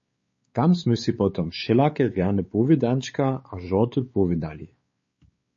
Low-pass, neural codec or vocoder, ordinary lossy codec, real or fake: 7.2 kHz; codec, 16 kHz, 4 kbps, X-Codec, WavLM features, trained on Multilingual LibriSpeech; MP3, 32 kbps; fake